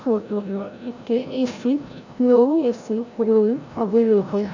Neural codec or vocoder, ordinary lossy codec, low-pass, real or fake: codec, 16 kHz, 0.5 kbps, FreqCodec, larger model; none; 7.2 kHz; fake